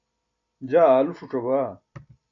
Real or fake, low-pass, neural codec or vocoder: real; 7.2 kHz; none